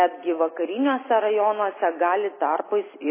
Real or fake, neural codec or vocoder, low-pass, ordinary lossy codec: real; none; 3.6 kHz; MP3, 16 kbps